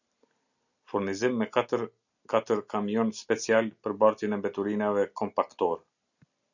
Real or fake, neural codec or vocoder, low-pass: real; none; 7.2 kHz